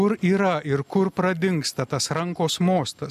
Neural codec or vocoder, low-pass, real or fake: none; 14.4 kHz; real